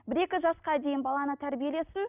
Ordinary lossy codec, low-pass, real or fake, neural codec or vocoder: none; 3.6 kHz; real; none